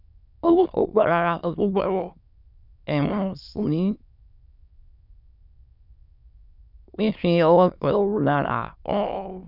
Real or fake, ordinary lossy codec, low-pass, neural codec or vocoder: fake; none; 5.4 kHz; autoencoder, 22.05 kHz, a latent of 192 numbers a frame, VITS, trained on many speakers